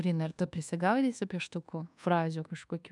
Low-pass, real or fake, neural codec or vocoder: 10.8 kHz; fake; codec, 24 kHz, 1.2 kbps, DualCodec